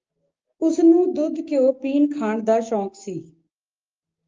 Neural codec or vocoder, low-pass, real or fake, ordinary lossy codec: codec, 16 kHz, 6 kbps, DAC; 7.2 kHz; fake; Opus, 24 kbps